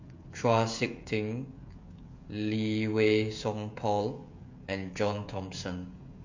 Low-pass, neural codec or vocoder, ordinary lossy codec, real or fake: 7.2 kHz; codec, 16 kHz, 16 kbps, FreqCodec, smaller model; MP3, 48 kbps; fake